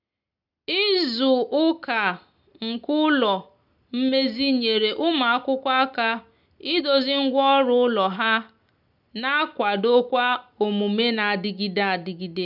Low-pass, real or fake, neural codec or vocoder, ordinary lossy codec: 5.4 kHz; real; none; none